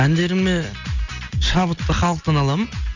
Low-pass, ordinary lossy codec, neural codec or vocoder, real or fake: 7.2 kHz; none; none; real